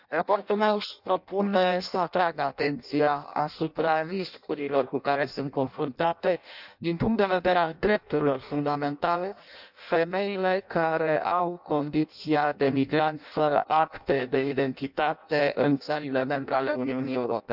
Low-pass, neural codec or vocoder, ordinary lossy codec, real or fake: 5.4 kHz; codec, 16 kHz in and 24 kHz out, 0.6 kbps, FireRedTTS-2 codec; none; fake